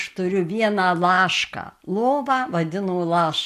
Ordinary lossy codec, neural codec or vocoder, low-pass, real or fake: AAC, 64 kbps; none; 14.4 kHz; real